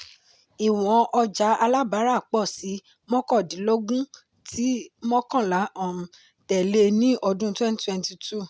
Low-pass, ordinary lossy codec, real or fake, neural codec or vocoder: none; none; real; none